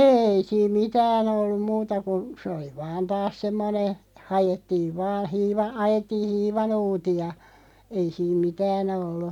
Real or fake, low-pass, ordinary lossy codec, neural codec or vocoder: real; 19.8 kHz; none; none